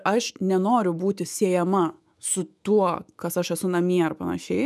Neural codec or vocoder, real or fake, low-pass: autoencoder, 48 kHz, 128 numbers a frame, DAC-VAE, trained on Japanese speech; fake; 14.4 kHz